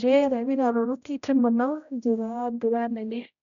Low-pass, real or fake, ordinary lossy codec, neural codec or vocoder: 7.2 kHz; fake; none; codec, 16 kHz, 0.5 kbps, X-Codec, HuBERT features, trained on general audio